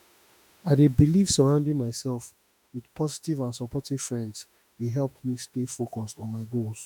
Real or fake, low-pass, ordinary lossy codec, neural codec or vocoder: fake; 19.8 kHz; none; autoencoder, 48 kHz, 32 numbers a frame, DAC-VAE, trained on Japanese speech